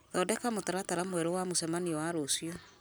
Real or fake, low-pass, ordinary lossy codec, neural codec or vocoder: real; none; none; none